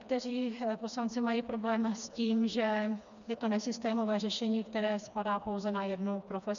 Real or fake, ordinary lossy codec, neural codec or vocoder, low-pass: fake; MP3, 96 kbps; codec, 16 kHz, 2 kbps, FreqCodec, smaller model; 7.2 kHz